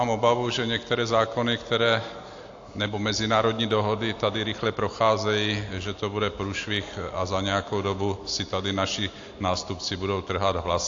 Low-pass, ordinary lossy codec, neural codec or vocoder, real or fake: 7.2 kHz; AAC, 48 kbps; none; real